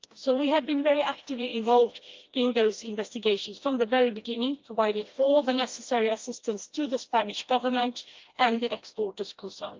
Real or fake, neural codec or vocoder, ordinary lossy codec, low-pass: fake; codec, 16 kHz, 1 kbps, FreqCodec, smaller model; Opus, 24 kbps; 7.2 kHz